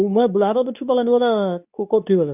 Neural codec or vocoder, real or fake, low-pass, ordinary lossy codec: codec, 24 kHz, 0.9 kbps, WavTokenizer, medium speech release version 2; fake; 3.6 kHz; none